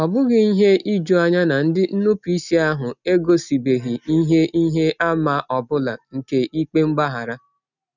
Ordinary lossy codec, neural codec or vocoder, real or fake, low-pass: none; none; real; 7.2 kHz